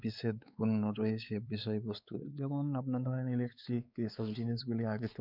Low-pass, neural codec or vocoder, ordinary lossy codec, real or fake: 5.4 kHz; codec, 16 kHz, 4 kbps, X-Codec, WavLM features, trained on Multilingual LibriSpeech; none; fake